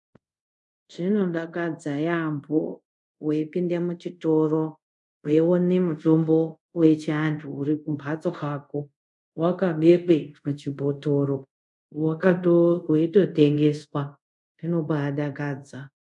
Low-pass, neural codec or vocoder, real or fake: 10.8 kHz; codec, 24 kHz, 0.5 kbps, DualCodec; fake